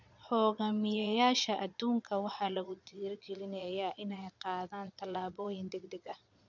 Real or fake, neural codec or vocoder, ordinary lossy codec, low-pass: fake; vocoder, 22.05 kHz, 80 mel bands, Vocos; none; 7.2 kHz